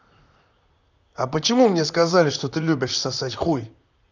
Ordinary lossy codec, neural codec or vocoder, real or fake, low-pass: none; vocoder, 44.1 kHz, 128 mel bands, Pupu-Vocoder; fake; 7.2 kHz